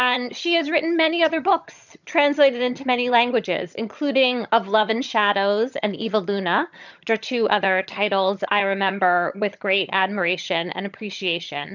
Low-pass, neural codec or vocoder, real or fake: 7.2 kHz; vocoder, 22.05 kHz, 80 mel bands, HiFi-GAN; fake